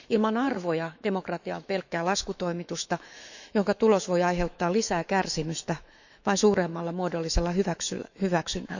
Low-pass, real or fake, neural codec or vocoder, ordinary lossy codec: 7.2 kHz; fake; autoencoder, 48 kHz, 128 numbers a frame, DAC-VAE, trained on Japanese speech; none